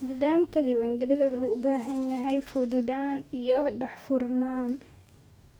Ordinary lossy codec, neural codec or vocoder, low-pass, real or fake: none; codec, 44.1 kHz, 2.6 kbps, DAC; none; fake